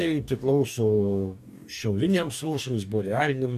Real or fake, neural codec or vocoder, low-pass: fake; codec, 44.1 kHz, 2.6 kbps, DAC; 14.4 kHz